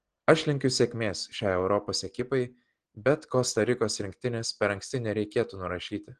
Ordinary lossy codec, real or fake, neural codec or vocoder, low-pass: Opus, 16 kbps; real; none; 9.9 kHz